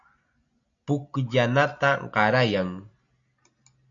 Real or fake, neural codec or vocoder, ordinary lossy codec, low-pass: real; none; AAC, 64 kbps; 7.2 kHz